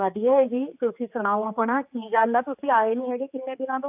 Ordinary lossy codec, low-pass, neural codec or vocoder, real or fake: AAC, 32 kbps; 3.6 kHz; codec, 16 kHz, 4 kbps, X-Codec, HuBERT features, trained on general audio; fake